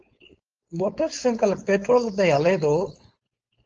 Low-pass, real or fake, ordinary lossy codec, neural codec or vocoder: 7.2 kHz; fake; Opus, 24 kbps; codec, 16 kHz, 4.8 kbps, FACodec